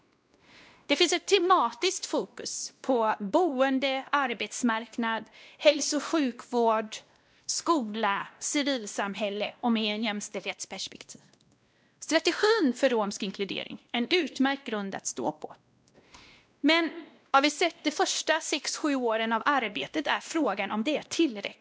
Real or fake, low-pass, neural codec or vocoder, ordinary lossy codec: fake; none; codec, 16 kHz, 1 kbps, X-Codec, WavLM features, trained on Multilingual LibriSpeech; none